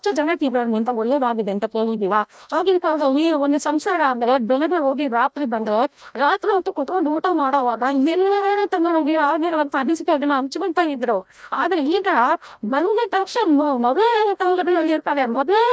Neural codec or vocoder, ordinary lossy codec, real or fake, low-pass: codec, 16 kHz, 0.5 kbps, FreqCodec, larger model; none; fake; none